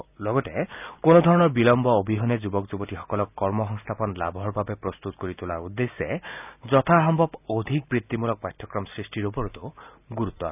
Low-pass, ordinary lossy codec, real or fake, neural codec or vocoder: 3.6 kHz; none; real; none